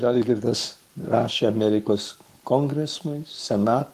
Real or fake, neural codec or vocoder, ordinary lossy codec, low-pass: fake; codec, 44.1 kHz, 7.8 kbps, Pupu-Codec; Opus, 24 kbps; 14.4 kHz